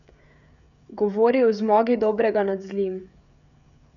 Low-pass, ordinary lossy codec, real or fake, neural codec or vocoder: 7.2 kHz; none; fake; codec, 16 kHz, 16 kbps, FreqCodec, smaller model